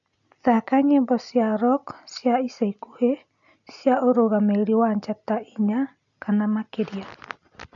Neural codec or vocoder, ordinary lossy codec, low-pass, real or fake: none; MP3, 96 kbps; 7.2 kHz; real